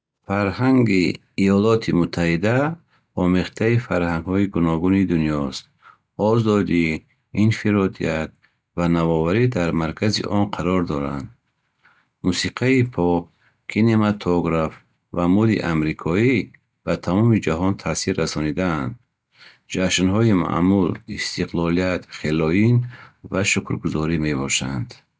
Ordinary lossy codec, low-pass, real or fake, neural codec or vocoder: none; none; real; none